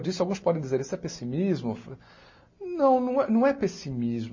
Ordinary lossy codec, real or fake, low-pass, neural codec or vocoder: MP3, 32 kbps; real; 7.2 kHz; none